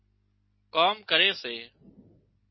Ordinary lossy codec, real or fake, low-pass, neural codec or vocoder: MP3, 24 kbps; real; 7.2 kHz; none